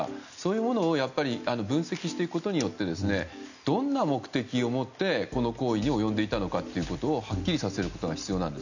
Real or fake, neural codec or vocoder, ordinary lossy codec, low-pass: real; none; none; 7.2 kHz